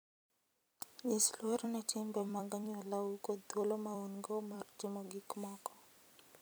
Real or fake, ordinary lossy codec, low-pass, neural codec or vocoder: fake; none; none; vocoder, 44.1 kHz, 128 mel bands every 256 samples, BigVGAN v2